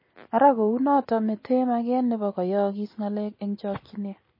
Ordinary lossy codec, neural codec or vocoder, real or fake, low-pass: MP3, 24 kbps; none; real; 5.4 kHz